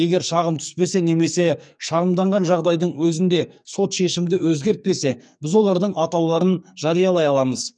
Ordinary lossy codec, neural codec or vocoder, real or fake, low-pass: none; codec, 44.1 kHz, 2.6 kbps, SNAC; fake; 9.9 kHz